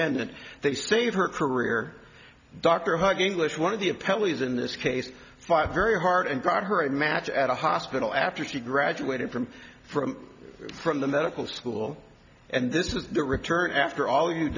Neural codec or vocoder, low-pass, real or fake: none; 7.2 kHz; real